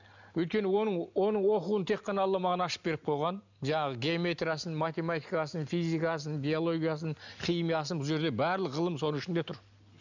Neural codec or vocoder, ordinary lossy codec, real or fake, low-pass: none; none; real; 7.2 kHz